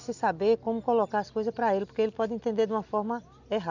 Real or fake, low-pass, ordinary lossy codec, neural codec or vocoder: real; 7.2 kHz; none; none